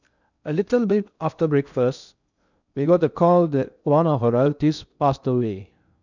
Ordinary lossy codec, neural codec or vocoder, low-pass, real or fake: none; codec, 16 kHz in and 24 kHz out, 0.6 kbps, FocalCodec, streaming, 2048 codes; 7.2 kHz; fake